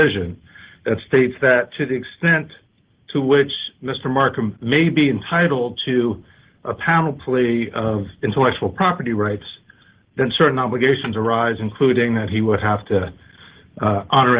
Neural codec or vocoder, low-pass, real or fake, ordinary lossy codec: none; 3.6 kHz; real; Opus, 16 kbps